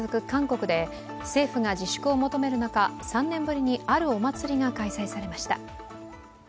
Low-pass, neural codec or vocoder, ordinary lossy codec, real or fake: none; none; none; real